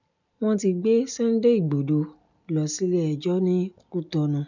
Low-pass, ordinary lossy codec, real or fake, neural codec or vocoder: 7.2 kHz; none; real; none